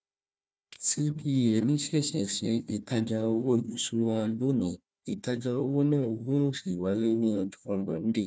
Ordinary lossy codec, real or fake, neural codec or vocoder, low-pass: none; fake; codec, 16 kHz, 1 kbps, FunCodec, trained on Chinese and English, 50 frames a second; none